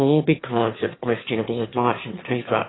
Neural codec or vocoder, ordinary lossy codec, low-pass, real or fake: autoencoder, 22.05 kHz, a latent of 192 numbers a frame, VITS, trained on one speaker; AAC, 16 kbps; 7.2 kHz; fake